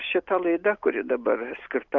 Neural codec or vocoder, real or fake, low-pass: none; real; 7.2 kHz